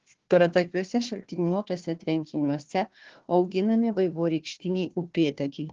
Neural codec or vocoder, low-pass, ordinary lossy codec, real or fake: codec, 16 kHz, 1 kbps, FunCodec, trained on Chinese and English, 50 frames a second; 7.2 kHz; Opus, 16 kbps; fake